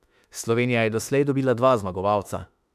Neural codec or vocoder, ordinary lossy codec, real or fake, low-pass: autoencoder, 48 kHz, 32 numbers a frame, DAC-VAE, trained on Japanese speech; none; fake; 14.4 kHz